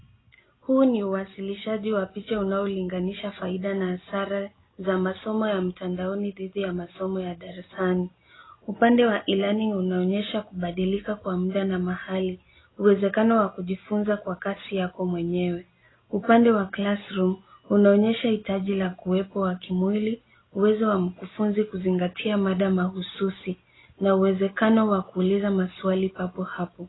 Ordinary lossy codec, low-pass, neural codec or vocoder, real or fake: AAC, 16 kbps; 7.2 kHz; none; real